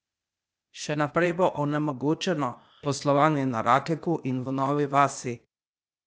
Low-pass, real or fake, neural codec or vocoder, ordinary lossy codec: none; fake; codec, 16 kHz, 0.8 kbps, ZipCodec; none